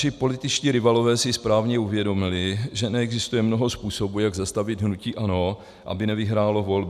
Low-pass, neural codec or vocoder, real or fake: 14.4 kHz; none; real